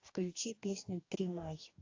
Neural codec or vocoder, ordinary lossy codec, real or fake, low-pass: codec, 44.1 kHz, 2.6 kbps, DAC; AAC, 48 kbps; fake; 7.2 kHz